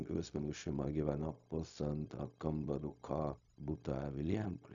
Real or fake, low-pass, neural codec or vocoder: fake; 7.2 kHz; codec, 16 kHz, 0.4 kbps, LongCat-Audio-Codec